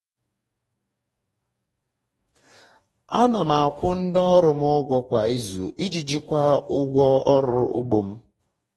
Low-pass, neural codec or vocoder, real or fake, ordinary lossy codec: 19.8 kHz; codec, 44.1 kHz, 2.6 kbps, DAC; fake; AAC, 32 kbps